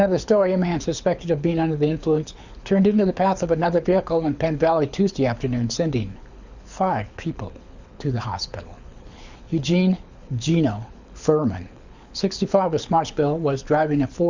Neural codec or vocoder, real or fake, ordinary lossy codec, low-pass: codec, 24 kHz, 6 kbps, HILCodec; fake; Opus, 64 kbps; 7.2 kHz